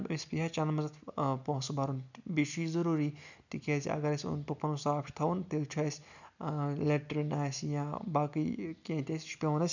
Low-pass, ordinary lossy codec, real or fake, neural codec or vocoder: 7.2 kHz; none; real; none